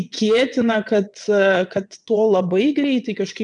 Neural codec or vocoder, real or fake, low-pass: vocoder, 22.05 kHz, 80 mel bands, WaveNeXt; fake; 9.9 kHz